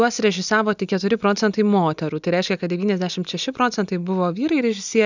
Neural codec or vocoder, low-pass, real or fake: none; 7.2 kHz; real